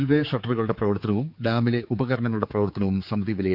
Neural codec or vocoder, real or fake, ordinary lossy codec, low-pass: codec, 16 kHz, 4 kbps, X-Codec, HuBERT features, trained on general audio; fake; none; 5.4 kHz